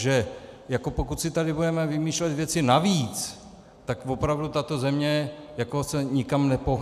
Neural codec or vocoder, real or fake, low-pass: none; real; 14.4 kHz